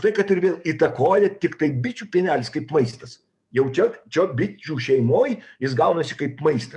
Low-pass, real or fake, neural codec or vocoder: 10.8 kHz; fake; codec, 44.1 kHz, 7.8 kbps, DAC